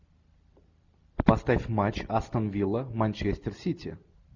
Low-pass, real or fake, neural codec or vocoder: 7.2 kHz; real; none